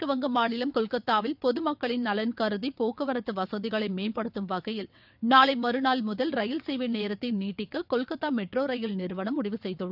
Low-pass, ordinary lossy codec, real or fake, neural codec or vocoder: 5.4 kHz; none; fake; vocoder, 22.05 kHz, 80 mel bands, WaveNeXt